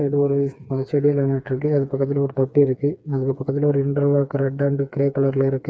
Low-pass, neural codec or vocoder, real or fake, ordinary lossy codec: none; codec, 16 kHz, 4 kbps, FreqCodec, smaller model; fake; none